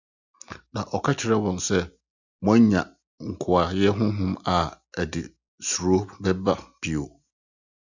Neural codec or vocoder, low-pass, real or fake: none; 7.2 kHz; real